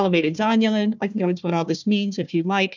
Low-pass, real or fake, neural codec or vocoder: 7.2 kHz; fake; codec, 16 kHz, 1 kbps, FunCodec, trained on Chinese and English, 50 frames a second